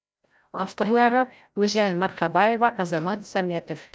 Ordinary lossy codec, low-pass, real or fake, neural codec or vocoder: none; none; fake; codec, 16 kHz, 0.5 kbps, FreqCodec, larger model